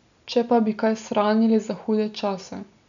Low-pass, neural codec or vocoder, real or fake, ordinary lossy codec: 7.2 kHz; none; real; none